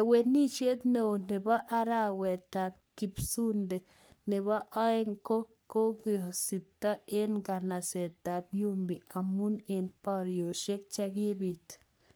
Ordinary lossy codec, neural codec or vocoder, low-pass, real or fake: none; codec, 44.1 kHz, 3.4 kbps, Pupu-Codec; none; fake